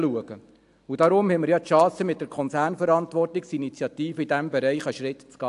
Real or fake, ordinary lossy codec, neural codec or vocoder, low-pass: real; AAC, 64 kbps; none; 10.8 kHz